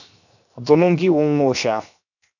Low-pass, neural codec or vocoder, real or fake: 7.2 kHz; codec, 16 kHz, 0.7 kbps, FocalCodec; fake